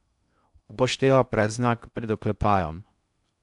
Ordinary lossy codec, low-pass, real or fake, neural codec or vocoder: none; 10.8 kHz; fake; codec, 16 kHz in and 24 kHz out, 0.6 kbps, FocalCodec, streaming, 2048 codes